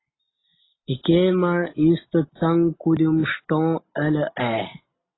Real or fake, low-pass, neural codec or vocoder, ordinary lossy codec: real; 7.2 kHz; none; AAC, 16 kbps